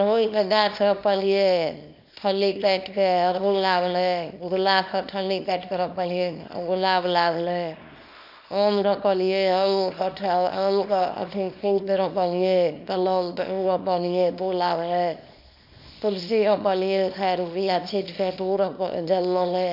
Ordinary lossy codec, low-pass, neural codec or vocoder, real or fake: none; 5.4 kHz; codec, 24 kHz, 0.9 kbps, WavTokenizer, small release; fake